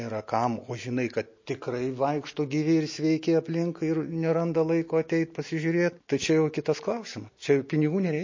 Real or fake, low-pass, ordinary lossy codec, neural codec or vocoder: real; 7.2 kHz; MP3, 32 kbps; none